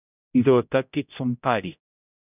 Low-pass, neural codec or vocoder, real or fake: 3.6 kHz; codec, 16 kHz, 0.5 kbps, X-Codec, HuBERT features, trained on general audio; fake